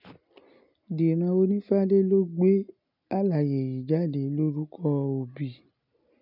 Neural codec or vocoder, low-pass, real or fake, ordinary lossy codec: none; 5.4 kHz; real; none